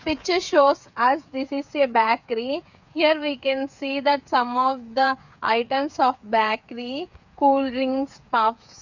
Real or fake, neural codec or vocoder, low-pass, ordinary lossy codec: fake; codec, 16 kHz, 8 kbps, FreqCodec, smaller model; 7.2 kHz; none